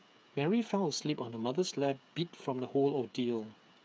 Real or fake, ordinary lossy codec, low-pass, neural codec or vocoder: fake; none; none; codec, 16 kHz, 8 kbps, FreqCodec, smaller model